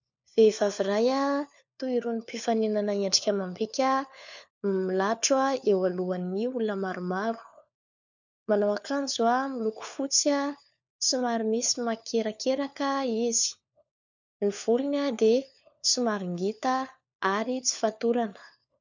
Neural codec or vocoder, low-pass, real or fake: codec, 16 kHz, 4 kbps, FunCodec, trained on LibriTTS, 50 frames a second; 7.2 kHz; fake